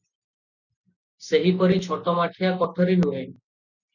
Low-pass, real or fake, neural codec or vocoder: 7.2 kHz; real; none